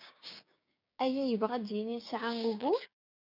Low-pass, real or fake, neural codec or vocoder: 5.4 kHz; fake; codec, 16 kHz, 6 kbps, DAC